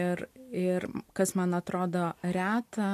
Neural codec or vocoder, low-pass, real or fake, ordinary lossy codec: none; 14.4 kHz; real; AAC, 64 kbps